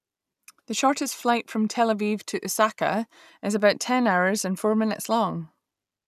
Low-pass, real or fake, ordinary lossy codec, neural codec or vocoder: 14.4 kHz; real; none; none